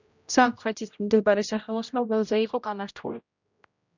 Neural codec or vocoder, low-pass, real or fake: codec, 16 kHz, 0.5 kbps, X-Codec, HuBERT features, trained on general audio; 7.2 kHz; fake